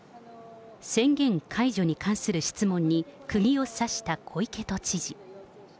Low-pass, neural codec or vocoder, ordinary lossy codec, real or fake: none; none; none; real